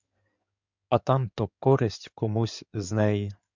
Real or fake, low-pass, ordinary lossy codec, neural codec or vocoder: fake; 7.2 kHz; MP3, 64 kbps; codec, 16 kHz in and 24 kHz out, 2.2 kbps, FireRedTTS-2 codec